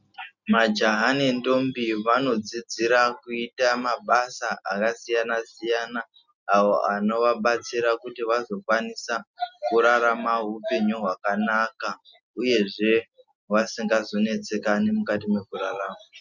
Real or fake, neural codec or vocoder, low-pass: real; none; 7.2 kHz